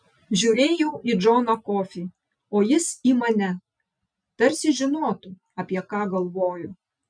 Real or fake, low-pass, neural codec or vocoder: real; 9.9 kHz; none